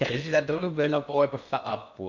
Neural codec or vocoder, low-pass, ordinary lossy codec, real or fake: codec, 16 kHz in and 24 kHz out, 0.8 kbps, FocalCodec, streaming, 65536 codes; 7.2 kHz; none; fake